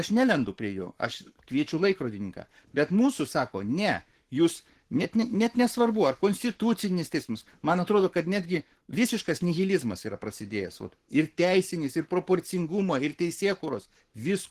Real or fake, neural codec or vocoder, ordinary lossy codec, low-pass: fake; codec, 44.1 kHz, 7.8 kbps, Pupu-Codec; Opus, 16 kbps; 14.4 kHz